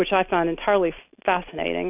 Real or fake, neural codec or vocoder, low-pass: real; none; 3.6 kHz